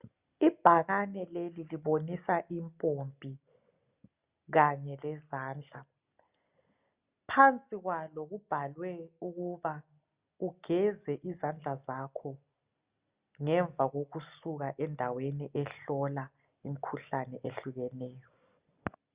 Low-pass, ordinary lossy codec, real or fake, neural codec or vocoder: 3.6 kHz; Opus, 64 kbps; real; none